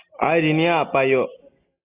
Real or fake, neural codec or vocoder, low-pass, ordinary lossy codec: real; none; 3.6 kHz; Opus, 64 kbps